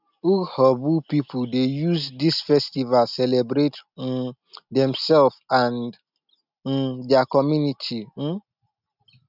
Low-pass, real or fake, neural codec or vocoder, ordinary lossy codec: 5.4 kHz; real; none; none